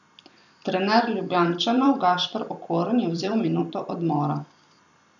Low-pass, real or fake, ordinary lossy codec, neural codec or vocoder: 7.2 kHz; fake; none; vocoder, 44.1 kHz, 128 mel bands every 256 samples, BigVGAN v2